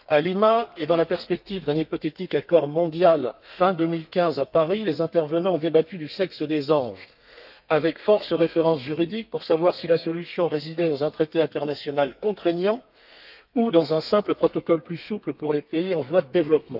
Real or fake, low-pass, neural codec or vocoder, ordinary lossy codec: fake; 5.4 kHz; codec, 32 kHz, 1.9 kbps, SNAC; none